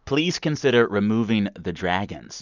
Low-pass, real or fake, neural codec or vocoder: 7.2 kHz; real; none